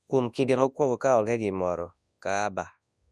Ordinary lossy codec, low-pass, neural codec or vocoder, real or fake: none; none; codec, 24 kHz, 0.9 kbps, WavTokenizer, large speech release; fake